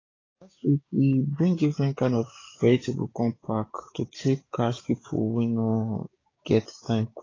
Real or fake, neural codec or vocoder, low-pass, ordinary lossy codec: fake; codec, 16 kHz, 6 kbps, DAC; 7.2 kHz; AAC, 32 kbps